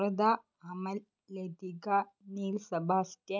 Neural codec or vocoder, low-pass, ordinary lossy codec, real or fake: none; 7.2 kHz; none; real